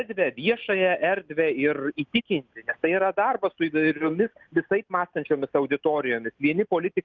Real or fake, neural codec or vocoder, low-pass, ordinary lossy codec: real; none; 7.2 kHz; Opus, 32 kbps